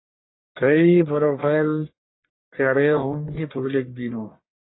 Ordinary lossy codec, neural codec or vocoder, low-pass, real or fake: AAC, 16 kbps; codec, 44.1 kHz, 1.7 kbps, Pupu-Codec; 7.2 kHz; fake